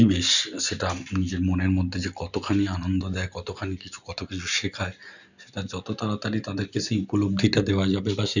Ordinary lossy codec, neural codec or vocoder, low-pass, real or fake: none; none; 7.2 kHz; real